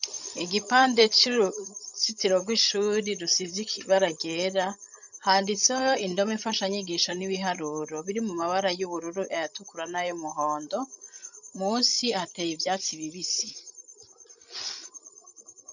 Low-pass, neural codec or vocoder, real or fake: 7.2 kHz; codec, 16 kHz, 16 kbps, FreqCodec, larger model; fake